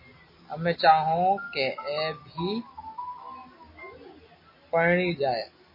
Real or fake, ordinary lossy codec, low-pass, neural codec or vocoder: real; MP3, 24 kbps; 5.4 kHz; none